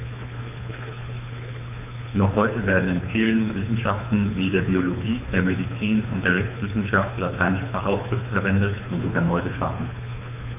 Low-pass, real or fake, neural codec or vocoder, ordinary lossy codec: 3.6 kHz; fake; codec, 24 kHz, 3 kbps, HILCodec; none